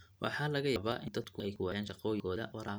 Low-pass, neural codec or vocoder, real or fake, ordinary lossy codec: none; none; real; none